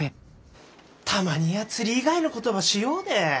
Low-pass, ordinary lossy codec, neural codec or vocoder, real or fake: none; none; none; real